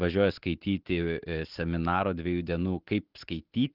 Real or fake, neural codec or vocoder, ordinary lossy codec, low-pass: real; none; Opus, 16 kbps; 5.4 kHz